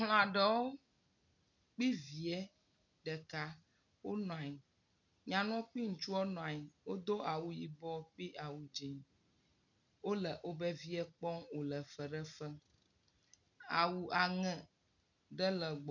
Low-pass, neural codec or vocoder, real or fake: 7.2 kHz; none; real